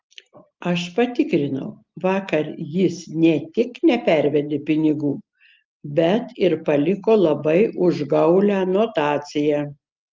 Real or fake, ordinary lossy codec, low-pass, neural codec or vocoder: real; Opus, 24 kbps; 7.2 kHz; none